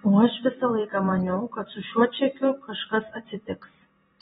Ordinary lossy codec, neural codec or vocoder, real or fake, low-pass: AAC, 16 kbps; vocoder, 44.1 kHz, 128 mel bands every 256 samples, BigVGAN v2; fake; 19.8 kHz